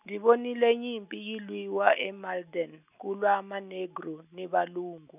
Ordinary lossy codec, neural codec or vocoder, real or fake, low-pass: none; none; real; 3.6 kHz